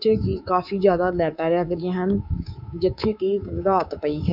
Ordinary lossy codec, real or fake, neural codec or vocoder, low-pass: none; fake; codec, 24 kHz, 3.1 kbps, DualCodec; 5.4 kHz